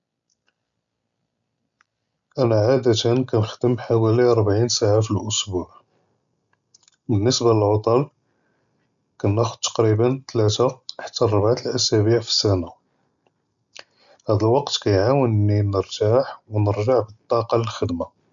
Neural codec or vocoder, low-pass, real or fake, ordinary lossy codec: none; 7.2 kHz; real; none